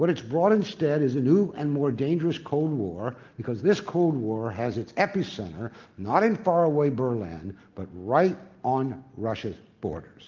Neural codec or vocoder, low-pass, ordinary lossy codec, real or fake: none; 7.2 kHz; Opus, 24 kbps; real